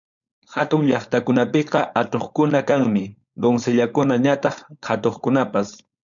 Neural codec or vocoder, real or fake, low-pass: codec, 16 kHz, 4.8 kbps, FACodec; fake; 7.2 kHz